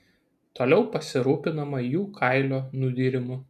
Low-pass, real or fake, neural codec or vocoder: 14.4 kHz; real; none